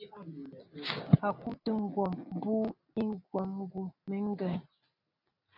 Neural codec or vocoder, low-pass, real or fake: none; 5.4 kHz; real